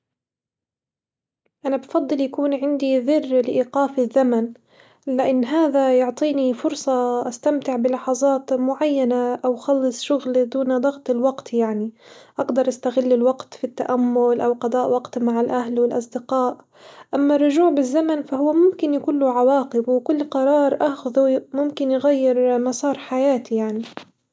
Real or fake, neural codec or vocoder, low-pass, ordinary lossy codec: real; none; none; none